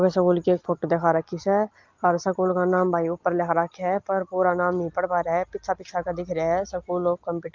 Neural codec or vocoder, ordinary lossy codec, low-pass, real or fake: none; Opus, 32 kbps; 7.2 kHz; real